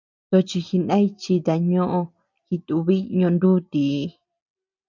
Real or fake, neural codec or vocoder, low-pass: real; none; 7.2 kHz